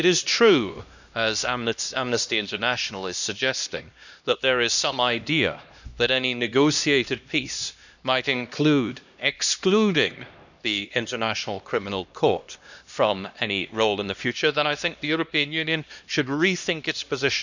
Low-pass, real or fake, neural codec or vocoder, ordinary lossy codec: 7.2 kHz; fake; codec, 16 kHz, 1 kbps, X-Codec, HuBERT features, trained on LibriSpeech; none